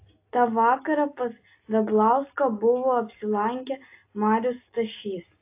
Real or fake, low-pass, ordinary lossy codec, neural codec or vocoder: real; 3.6 kHz; AAC, 24 kbps; none